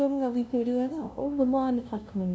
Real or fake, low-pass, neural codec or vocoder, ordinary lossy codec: fake; none; codec, 16 kHz, 0.5 kbps, FunCodec, trained on LibriTTS, 25 frames a second; none